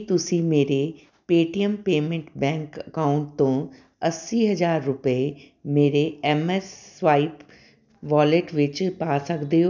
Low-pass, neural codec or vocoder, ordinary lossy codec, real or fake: 7.2 kHz; none; none; real